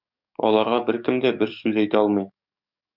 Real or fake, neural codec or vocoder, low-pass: fake; codec, 44.1 kHz, 7.8 kbps, DAC; 5.4 kHz